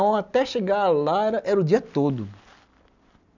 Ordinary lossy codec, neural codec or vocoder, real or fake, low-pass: none; none; real; 7.2 kHz